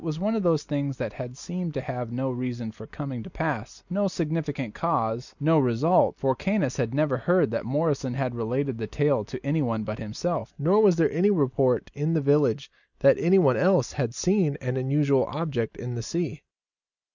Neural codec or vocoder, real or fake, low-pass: none; real; 7.2 kHz